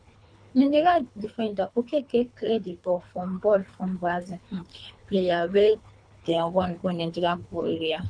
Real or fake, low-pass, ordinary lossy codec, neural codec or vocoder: fake; 9.9 kHz; none; codec, 24 kHz, 3 kbps, HILCodec